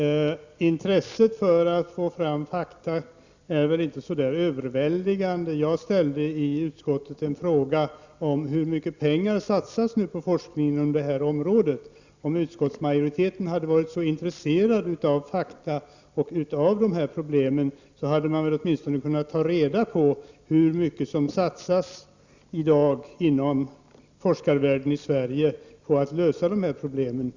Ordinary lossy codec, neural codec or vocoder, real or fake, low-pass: Opus, 64 kbps; none; real; 7.2 kHz